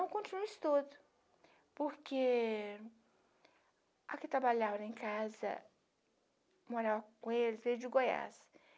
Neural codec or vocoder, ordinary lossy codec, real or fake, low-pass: none; none; real; none